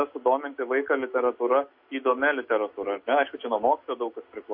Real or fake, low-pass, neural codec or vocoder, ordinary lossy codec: real; 5.4 kHz; none; MP3, 32 kbps